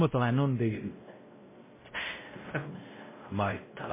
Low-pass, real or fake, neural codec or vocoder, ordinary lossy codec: 3.6 kHz; fake; codec, 16 kHz, 0.5 kbps, X-Codec, WavLM features, trained on Multilingual LibriSpeech; MP3, 16 kbps